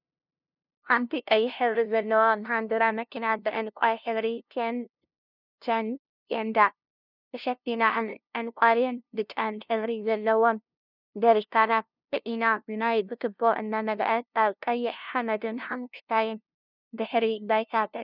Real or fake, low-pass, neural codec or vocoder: fake; 5.4 kHz; codec, 16 kHz, 0.5 kbps, FunCodec, trained on LibriTTS, 25 frames a second